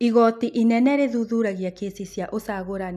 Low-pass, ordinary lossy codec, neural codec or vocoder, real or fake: 14.4 kHz; none; none; real